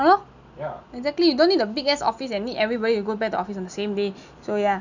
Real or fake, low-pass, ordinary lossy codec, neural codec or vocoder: real; 7.2 kHz; none; none